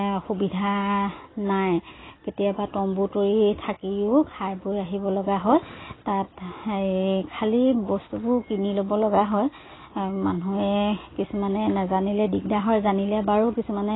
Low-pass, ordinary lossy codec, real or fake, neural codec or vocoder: 7.2 kHz; AAC, 16 kbps; real; none